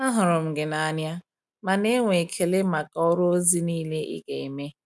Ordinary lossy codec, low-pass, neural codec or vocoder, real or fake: none; none; none; real